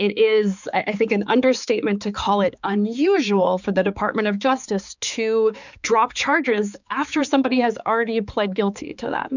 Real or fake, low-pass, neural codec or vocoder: fake; 7.2 kHz; codec, 16 kHz, 4 kbps, X-Codec, HuBERT features, trained on balanced general audio